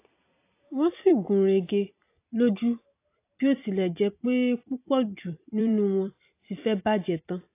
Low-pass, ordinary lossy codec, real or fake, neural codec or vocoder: 3.6 kHz; AAC, 24 kbps; fake; vocoder, 44.1 kHz, 128 mel bands every 256 samples, BigVGAN v2